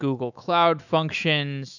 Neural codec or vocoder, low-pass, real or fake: autoencoder, 48 kHz, 128 numbers a frame, DAC-VAE, trained on Japanese speech; 7.2 kHz; fake